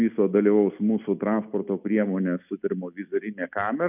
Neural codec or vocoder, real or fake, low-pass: none; real; 3.6 kHz